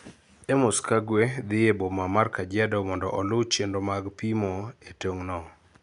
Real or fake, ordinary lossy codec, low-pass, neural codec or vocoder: real; none; 10.8 kHz; none